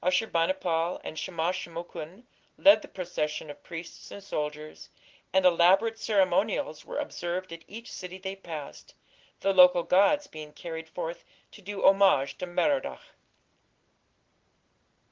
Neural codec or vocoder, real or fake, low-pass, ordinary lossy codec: none; real; 7.2 kHz; Opus, 16 kbps